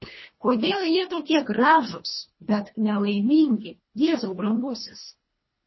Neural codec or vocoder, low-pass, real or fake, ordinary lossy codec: codec, 24 kHz, 1.5 kbps, HILCodec; 7.2 kHz; fake; MP3, 24 kbps